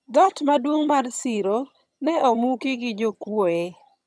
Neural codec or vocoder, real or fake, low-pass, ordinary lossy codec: vocoder, 22.05 kHz, 80 mel bands, HiFi-GAN; fake; none; none